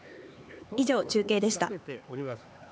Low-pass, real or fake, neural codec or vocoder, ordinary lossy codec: none; fake; codec, 16 kHz, 4 kbps, X-Codec, HuBERT features, trained on LibriSpeech; none